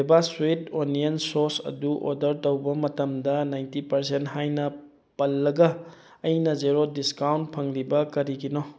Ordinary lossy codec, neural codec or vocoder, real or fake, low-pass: none; none; real; none